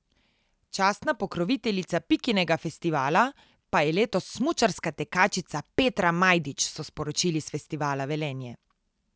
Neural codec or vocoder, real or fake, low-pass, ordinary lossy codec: none; real; none; none